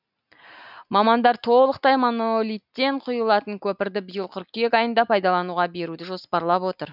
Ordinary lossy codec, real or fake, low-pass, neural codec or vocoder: none; real; 5.4 kHz; none